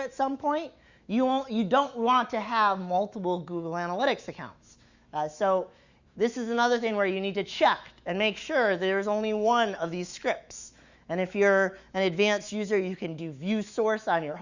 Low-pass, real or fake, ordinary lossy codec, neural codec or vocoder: 7.2 kHz; fake; Opus, 64 kbps; autoencoder, 48 kHz, 128 numbers a frame, DAC-VAE, trained on Japanese speech